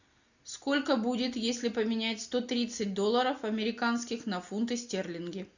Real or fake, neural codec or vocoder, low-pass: real; none; 7.2 kHz